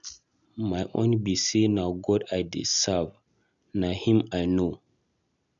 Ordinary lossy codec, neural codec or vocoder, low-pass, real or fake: none; none; 7.2 kHz; real